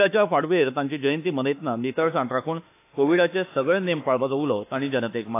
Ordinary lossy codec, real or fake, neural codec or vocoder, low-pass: AAC, 24 kbps; fake; autoencoder, 48 kHz, 32 numbers a frame, DAC-VAE, trained on Japanese speech; 3.6 kHz